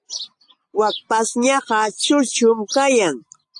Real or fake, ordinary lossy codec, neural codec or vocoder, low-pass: real; AAC, 64 kbps; none; 10.8 kHz